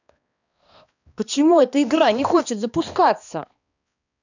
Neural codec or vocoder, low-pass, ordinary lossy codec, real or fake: codec, 16 kHz, 2 kbps, X-Codec, HuBERT features, trained on balanced general audio; 7.2 kHz; none; fake